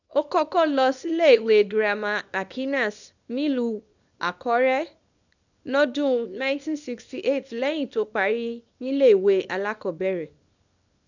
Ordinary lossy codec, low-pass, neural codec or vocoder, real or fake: none; 7.2 kHz; codec, 24 kHz, 0.9 kbps, WavTokenizer, small release; fake